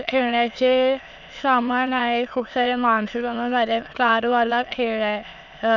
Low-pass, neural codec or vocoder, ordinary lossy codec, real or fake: 7.2 kHz; autoencoder, 22.05 kHz, a latent of 192 numbers a frame, VITS, trained on many speakers; none; fake